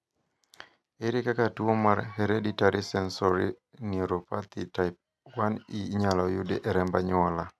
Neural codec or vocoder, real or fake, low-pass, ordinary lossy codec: none; real; none; none